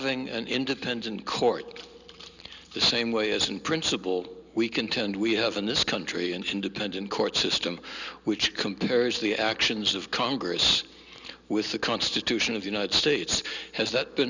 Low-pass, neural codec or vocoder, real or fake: 7.2 kHz; none; real